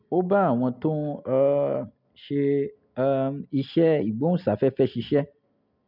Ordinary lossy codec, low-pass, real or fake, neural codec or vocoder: none; 5.4 kHz; real; none